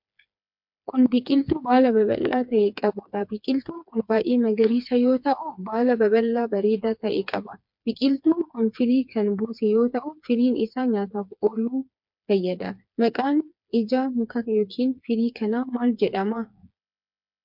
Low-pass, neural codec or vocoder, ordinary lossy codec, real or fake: 5.4 kHz; codec, 16 kHz, 4 kbps, FreqCodec, smaller model; MP3, 48 kbps; fake